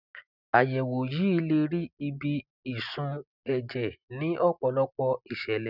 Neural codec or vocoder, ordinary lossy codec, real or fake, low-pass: none; none; real; 5.4 kHz